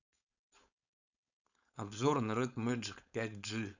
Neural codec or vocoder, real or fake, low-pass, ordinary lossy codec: codec, 16 kHz, 4.8 kbps, FACodec; fake; 7.2 kHz; none